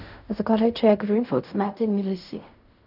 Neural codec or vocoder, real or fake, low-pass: codec, 16 kHz in and 24 kHz out, 0.4 kbps, LongCat-Audio-Codec, fine tuned four codebook decoder; fake; 5.4 kHz